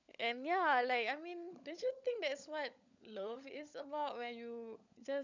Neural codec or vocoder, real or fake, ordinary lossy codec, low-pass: codec, 16 kHz, 16 kbps, FunCodec, trained on LibriTTS, 50 frames a second; fake; none; 7.2 kHz